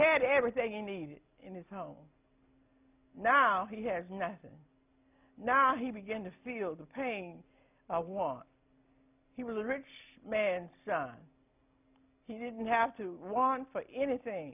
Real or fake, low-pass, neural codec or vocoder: real; 3.6 kHz; none